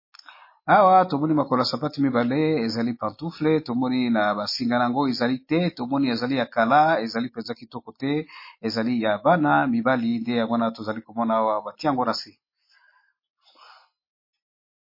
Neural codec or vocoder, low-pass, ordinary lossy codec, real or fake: none; 5.4 kHz; MP3, 24 kbps; real